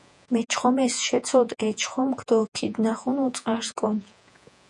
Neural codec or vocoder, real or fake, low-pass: vocoder, 48 kHz, 128 mel bands, Vocos; fake; 10.8 kHz